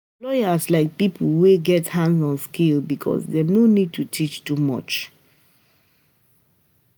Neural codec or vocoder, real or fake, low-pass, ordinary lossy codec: none; real; none; none